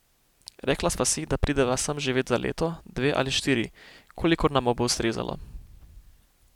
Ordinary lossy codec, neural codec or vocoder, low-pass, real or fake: none; none; 19.8 kHz; real